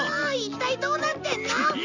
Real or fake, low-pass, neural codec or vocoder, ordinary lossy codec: real; 7.2 kHz; none; none